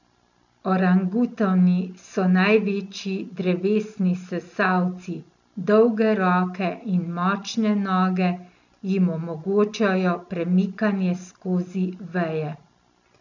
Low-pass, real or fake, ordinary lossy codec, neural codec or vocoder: 7.2 kHz; real; none; none